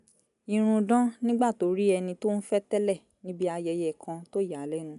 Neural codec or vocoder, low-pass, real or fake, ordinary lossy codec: none; 10.8 kHz; real; none